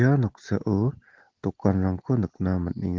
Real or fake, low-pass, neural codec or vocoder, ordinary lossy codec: real; 7.2 kHz; none; Opus, 16 kbps